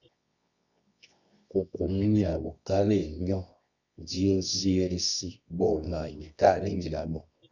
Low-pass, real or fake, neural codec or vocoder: 7.2 kHz; fake; codec, 24 kHz, 0.9 kbps, WavTokenizer, medium music audio release